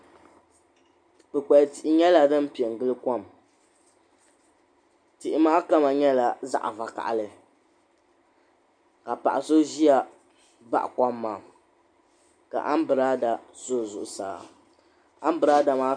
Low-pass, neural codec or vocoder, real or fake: 9.9 kHz; none; real